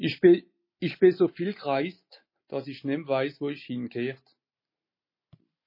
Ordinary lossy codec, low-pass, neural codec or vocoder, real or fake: MP3, 24 kbps; 5.4 kHz; none; real